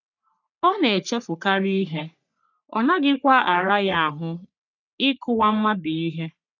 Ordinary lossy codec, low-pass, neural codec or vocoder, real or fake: none; 7.2 kHz; codec, 44.1 kHz, 3.4 kbps, Pupu-Codec; fake